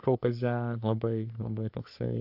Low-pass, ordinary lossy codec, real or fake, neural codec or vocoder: 5.4 kHz; AAC, 48 kbps; fake; codec, 44.1 kHz, 3.4 kbps, Pupu-Codec